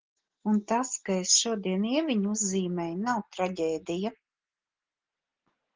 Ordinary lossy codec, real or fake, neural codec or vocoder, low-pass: Opus, 16 kbps; real; none; 7.2 kHz